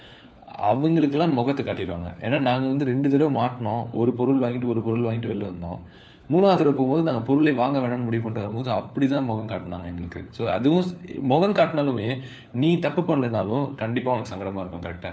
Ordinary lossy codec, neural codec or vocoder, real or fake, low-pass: none; codec, 16 kHz, 4 kbps, FunCodec, trained on LibriTTS, 50 frames a second; fake; none